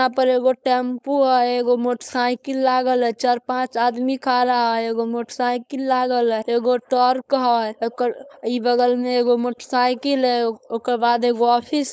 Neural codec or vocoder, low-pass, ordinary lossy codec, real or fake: codec, 16 kHz, 4.8 kbps, FACodec; none; none; fake